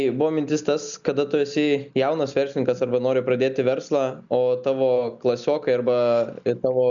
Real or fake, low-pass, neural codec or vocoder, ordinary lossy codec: real; 7.2 kHz; none; MP3, 96 kbps